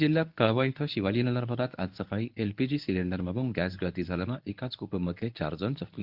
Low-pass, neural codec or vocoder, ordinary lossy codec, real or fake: 5.4 kHz; codec, 24 kHz, 0.9 kbps, WavTokenizer, medium speech release version 1; Opus, 32 kbps; fake